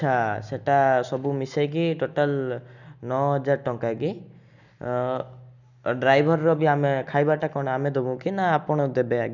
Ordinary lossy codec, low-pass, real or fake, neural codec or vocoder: none; 7.2 kHz; real; none